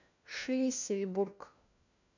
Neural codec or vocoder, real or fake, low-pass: codec, 16 kHz, 1 kbps, FunCodec, trained on LibriTTS, 50 frames a second; fake; 7.2 kHz